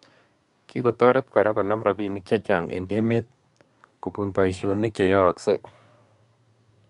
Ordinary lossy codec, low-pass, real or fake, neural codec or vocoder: none; 10.8 kHz; fake; codec, 24 kHz, 1 kbps, SNAC